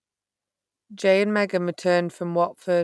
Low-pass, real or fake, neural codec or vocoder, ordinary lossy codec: none; real; none; none